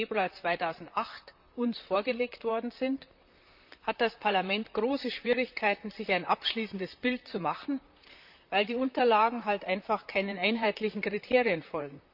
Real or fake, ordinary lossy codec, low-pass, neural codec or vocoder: fake; none; 5.4 kHz; vocoder, 44.1 kHz, 128 mel bands, Pupu-Vocoder